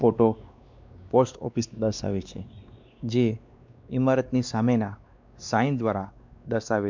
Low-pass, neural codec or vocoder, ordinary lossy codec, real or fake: 7.2 kHz; codec, 16 kHz, 2 kbps, X-Codec, WavLM features, trained on Multilingual LibriSpeech; MP3, 64 kbps; fake